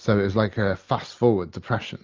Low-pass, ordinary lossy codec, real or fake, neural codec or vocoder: 7.2 kHz; Opus, 32 kbps; real; none